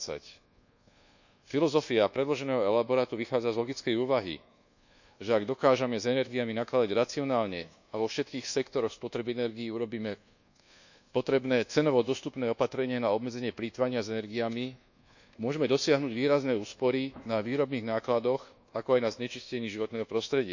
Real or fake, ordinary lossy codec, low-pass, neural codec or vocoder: fake; none; 7.2 kHz; codec, 24 kHz, 1.2 kbps, DualCodec